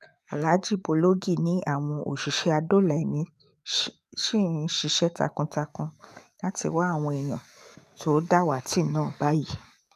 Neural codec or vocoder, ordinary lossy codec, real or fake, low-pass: codec, 44.1 kHz, 7.8 kbps, DAC; none; fake; 14.4 kHz